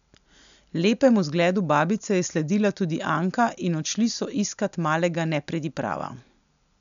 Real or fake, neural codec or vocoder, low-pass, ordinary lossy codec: real; none; 7.2 kHz; none